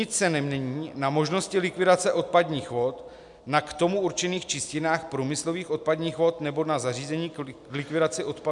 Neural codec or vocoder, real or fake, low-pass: none; real; 10.8 kHz